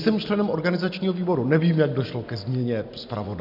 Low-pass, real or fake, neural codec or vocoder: 5.4 kHz; real; none